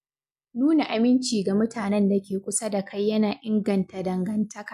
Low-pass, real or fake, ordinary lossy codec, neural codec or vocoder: 14.4 kHz; real; none; none